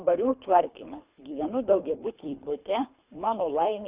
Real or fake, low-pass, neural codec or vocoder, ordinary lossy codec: fake; 3.6 kHz; codec, 24 kHz, 3 kbps, HILCodec; Opus, 64 kbps